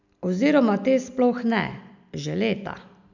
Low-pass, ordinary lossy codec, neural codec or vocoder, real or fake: 7.2 kHz; none; none; real